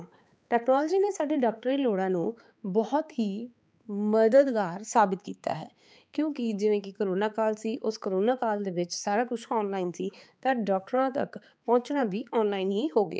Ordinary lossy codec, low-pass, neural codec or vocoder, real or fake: none; none; codec, 16 kHz, 4 kbps, X-Codec, HuBERT features, trained on balanced general audio; fake